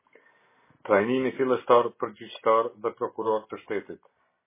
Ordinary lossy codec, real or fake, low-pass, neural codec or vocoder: MP3, 16 kbps; real; 3.6 kHz; none